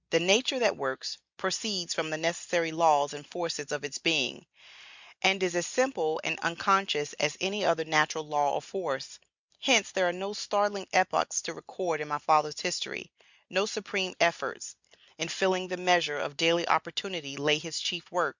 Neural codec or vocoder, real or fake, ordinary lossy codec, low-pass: none; real; Opus, 64 kbps; 7.2 kHz